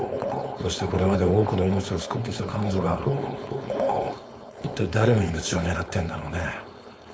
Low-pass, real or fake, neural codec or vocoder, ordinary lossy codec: none; fake; codec, 16 kHz, 4.8 kbps, FACodec; none